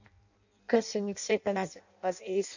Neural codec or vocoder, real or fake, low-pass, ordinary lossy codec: codec, 16 kHz in and 24 kHz out, 0.6 kbps, FireRedTTS-2 codec; fake; 7.2 kHz; none